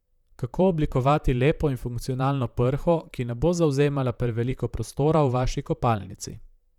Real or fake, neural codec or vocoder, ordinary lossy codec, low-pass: fake; vocoder, 44.1 kHz, 128 mel bands, Pupu-Vocoder; none; 19.8 kHz